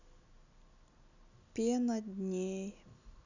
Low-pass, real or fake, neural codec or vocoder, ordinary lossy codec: 7.2 kHz; real; none; none